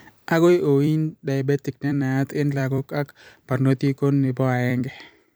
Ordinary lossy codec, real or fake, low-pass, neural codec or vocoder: none; fake; none; vocoder, 44.1 kHz, 128 mel bands every 256 samples, BigVGAN v2